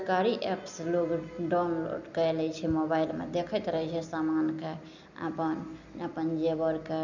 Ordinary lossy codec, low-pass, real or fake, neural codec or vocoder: none; 7.2 kHz; real; none